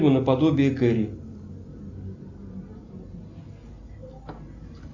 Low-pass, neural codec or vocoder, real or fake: 7.2 kHz; none; real